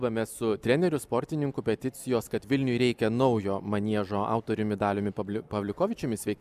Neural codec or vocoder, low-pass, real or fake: none; 14.4 kHz; real